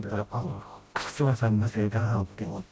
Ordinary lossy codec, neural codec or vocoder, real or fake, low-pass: none; codec, 16 kHz, 0.5 kbps, FreqCodec, smaller model; fake; none